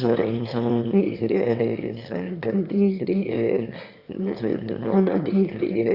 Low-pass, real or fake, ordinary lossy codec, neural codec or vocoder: 5.4 kHz; fake; Opus, 64 kbps; autoencoder, 22.05 kHz, a latent of 192 numbers a frame, VITS, trained on one speaker